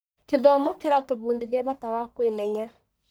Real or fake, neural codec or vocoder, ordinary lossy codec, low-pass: fake; codec, 44.1 kHz, 1.7 kbps, Pupu-Codec; none; none